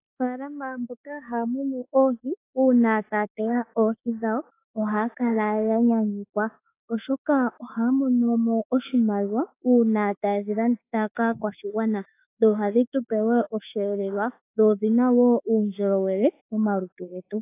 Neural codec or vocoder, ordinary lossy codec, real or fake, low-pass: autoencoder, 48 kHz, 32 numbers a frame, DAC-VAE, trained on Japanese speech; AAC, 24 kbps; fake; 3.6 kHz